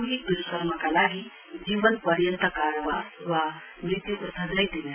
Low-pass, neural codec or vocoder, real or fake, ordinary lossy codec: 3.6 kHz; none; real; none